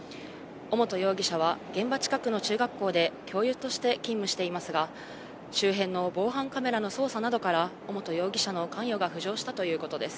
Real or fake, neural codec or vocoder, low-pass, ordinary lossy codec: real; none; none; none